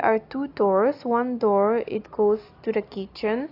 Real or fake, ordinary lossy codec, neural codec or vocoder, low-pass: real; AAC, 32 kbps; none; 5.4 kHz